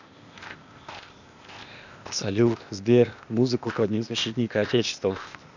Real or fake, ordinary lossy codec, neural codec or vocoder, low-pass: fake; none; codec, 16 kHz, 0.8 kbps, ZipCodec; 7.2 kHz